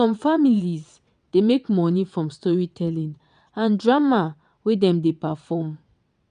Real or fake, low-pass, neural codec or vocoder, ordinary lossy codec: fake; 9.9 kHz; vocoder, 22.05 kHz, 80 mel bands, WaveNeXt; none